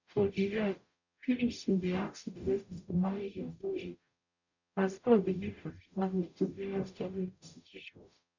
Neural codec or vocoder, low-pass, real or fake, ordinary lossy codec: codec, 44.1 kHz, 0.9 kbps, DAC; 7.2 kHz; fake; none